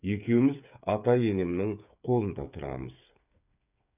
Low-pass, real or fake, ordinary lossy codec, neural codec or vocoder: 3.6 kHz; fake; none; codec, 16 kHz, 8 kbps, FreqCodec, smaller model